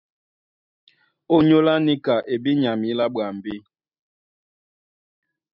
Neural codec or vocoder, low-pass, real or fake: none; 5.4 kHz; real